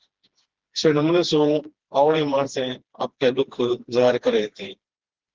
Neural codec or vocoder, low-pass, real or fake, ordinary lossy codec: codec, 16 kHz, 2 kbps, FreqCodec, smaller model; 7.2 kHz; fake; Opus, 16 kbps